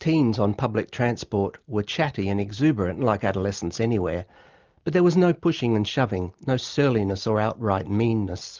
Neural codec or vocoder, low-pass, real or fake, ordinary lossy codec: none; 7.2 kHz; real; Opus, 24 kbps